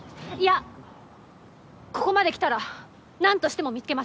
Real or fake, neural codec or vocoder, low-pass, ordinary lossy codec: real; none; none; none